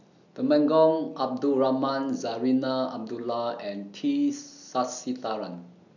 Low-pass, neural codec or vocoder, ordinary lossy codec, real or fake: 7.2 kHz; none; none; real